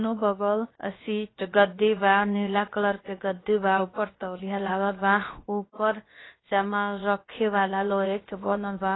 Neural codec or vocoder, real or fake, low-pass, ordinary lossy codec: codec, 16 kHz, about 1 kbps, DyCAST, with the encoder's durations; fake; 7.2 kHz; AAC, 16 kbps